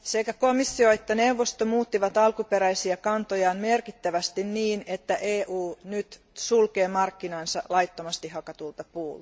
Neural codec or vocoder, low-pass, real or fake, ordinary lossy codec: none; none; real; none